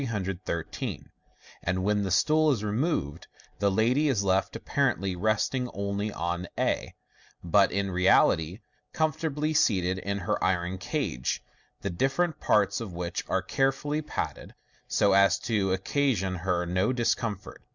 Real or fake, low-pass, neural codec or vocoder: real; 7.2 kHz; none